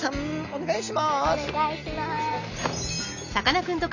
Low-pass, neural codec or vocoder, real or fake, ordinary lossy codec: 7.2 kHz; none; real; none